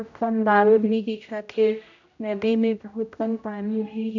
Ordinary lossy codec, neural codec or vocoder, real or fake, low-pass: none; codec, 16 kHz, 0.5 kbps, X-Codec, HuBERT features, trained on general audio; fake; 7.2 kHz